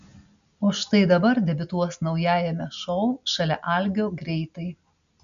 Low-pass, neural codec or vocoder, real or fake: 7.2 kHz; none; real